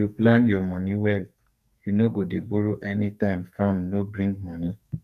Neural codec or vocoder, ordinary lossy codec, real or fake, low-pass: codec, 44.1 kHz, 2.6 kbps, SNAC; none; fake; 14.4 kHz